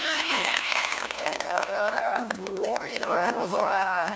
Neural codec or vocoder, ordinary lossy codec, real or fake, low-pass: codec, 16 kHz, 1 kbps, FunCodec, trained on LibriTTS, 50 frames a second; none; fake; none